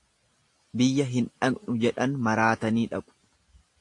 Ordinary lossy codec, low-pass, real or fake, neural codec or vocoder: AAC, 48 kbps; 10.8 kHz; real; none